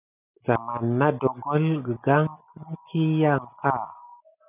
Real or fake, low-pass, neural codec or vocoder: real; 3.6 kHz; none